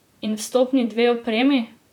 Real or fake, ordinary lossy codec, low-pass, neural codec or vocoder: fake; none; 19.8 kHz; vocoder, 44.1 kHz, 128 mel bands, Pupu-Vocoder